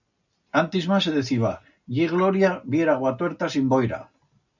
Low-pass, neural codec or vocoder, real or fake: 7.2 kHz; none; real